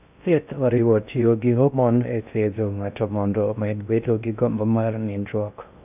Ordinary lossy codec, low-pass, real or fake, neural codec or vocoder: none; 3.6 kHz; fake; codec, 16 kHz in and 24 kHz out, 0.6 kbps, FocalCodec, streaming, 2048 codes